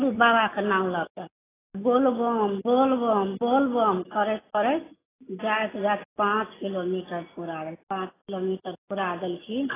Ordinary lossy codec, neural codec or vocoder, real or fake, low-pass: AAC, 16 kbps; none; real; 3.6 kHz